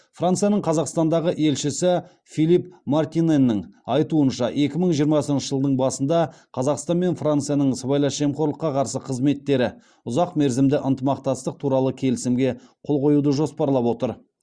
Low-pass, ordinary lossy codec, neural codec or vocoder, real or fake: 9.9 kHz; Opus, 64 kbps; none; real